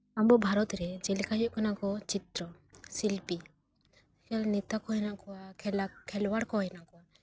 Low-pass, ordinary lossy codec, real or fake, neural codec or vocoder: none; none; real; none